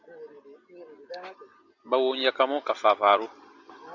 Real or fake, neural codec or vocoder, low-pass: real; none; 7.2 kHz